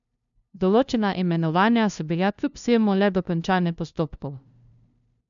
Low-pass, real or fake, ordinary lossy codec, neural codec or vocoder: 7.2 kHz; fake; none; codec, 16 kHz, 0.5 kbps, FunCodec, trained on LibriTTS, 25 frames a second